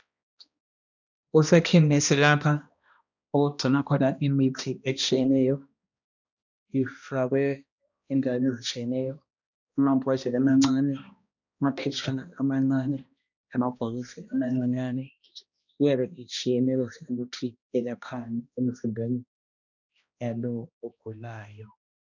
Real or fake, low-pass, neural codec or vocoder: fake; 7.2 kHz; codec, 16 kHz, 1 kbps, X-Codec, HuBERT features, trained on balanced general audio